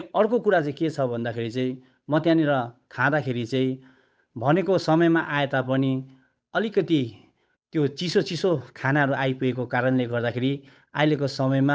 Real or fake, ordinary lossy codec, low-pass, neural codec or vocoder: fake; none; none; codec, 16 kHz, 8 kbps, FunCodec, trained on Chinese and English, 25 frames a second